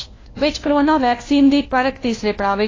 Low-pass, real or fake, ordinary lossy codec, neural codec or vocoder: 7.2 kHz; fake; AAC, 32 kbps; codec, 16 kHz, 1 kbps, FunCodec, trained on LibriTTS, 50 frames a second